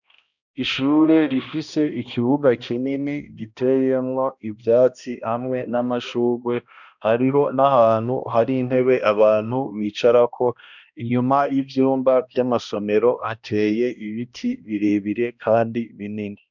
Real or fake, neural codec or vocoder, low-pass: fake; codec, 16 kHz, 1 kbps, X-Codec, HuBERT features, trained on balanced general audio; 7.2 kHz